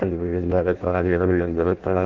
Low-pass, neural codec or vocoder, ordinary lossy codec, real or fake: 7.2 kHz; codec, 16 kHz in and 24 kHz out, 0.6 kbps, FireRedTTS-2 codec; Opus, 24 kbps; fake